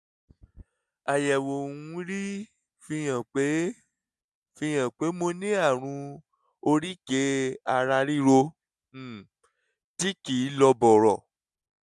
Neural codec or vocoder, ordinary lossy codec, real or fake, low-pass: none; none; real; none